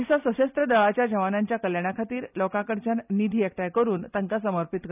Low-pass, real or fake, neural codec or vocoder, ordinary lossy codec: 3.6 kHz; real; none; none